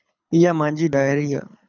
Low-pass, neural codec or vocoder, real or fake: 7.2 kHz; codec, 24 kHz, 6 kbps, HILCodec; fake